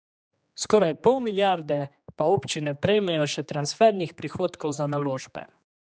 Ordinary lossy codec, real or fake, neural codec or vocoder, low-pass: none; fake; codec, 16 kHz, 2 kbps, X-Codec, HuBERT features, trained on general audio; none